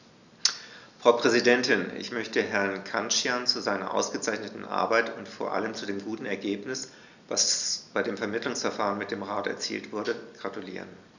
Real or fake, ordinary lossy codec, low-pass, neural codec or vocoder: real; none; 7.2 kHz; none